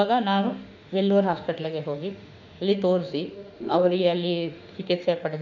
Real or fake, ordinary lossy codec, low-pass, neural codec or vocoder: fake; none; 7.2 kHz; autoencoder, 48 kHz, 32 numbers a frame, DAC-VAE, trained on Japanese speech